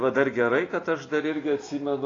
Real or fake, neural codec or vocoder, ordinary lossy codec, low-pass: real; none; AAC, 32 kbps; 7.2 kHz